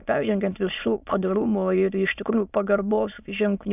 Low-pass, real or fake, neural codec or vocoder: 3.6 kHz; fake; autoencoder, 22.05 kHz, a latent of 192 numbers a frame, VITS, trained on many speakers